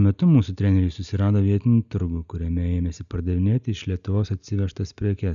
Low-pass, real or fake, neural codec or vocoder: 7.2 kHz; real; none